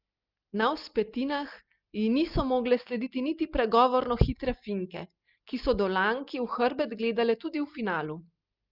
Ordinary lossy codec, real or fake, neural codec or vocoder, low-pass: Opus, 24 kbps; real; none; 5.4 kHz